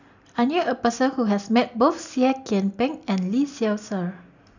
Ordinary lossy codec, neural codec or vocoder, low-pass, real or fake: none; none; 7.2 kHz; real